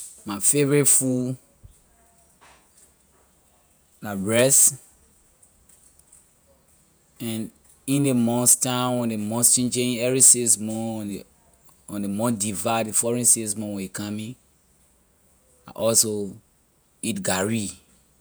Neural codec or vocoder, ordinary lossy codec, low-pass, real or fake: vocoder, 48 kHz, 128 mel bands, Vocos; none; none; fake